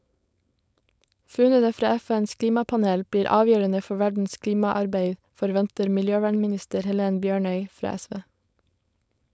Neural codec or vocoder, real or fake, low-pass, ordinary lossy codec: codec, 16 kHz, 4.8 kbps, FACodec; fake; none; none